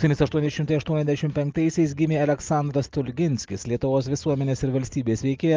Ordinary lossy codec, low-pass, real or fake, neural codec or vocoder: Opus, 32 kbps; 7.2 kHz; real; none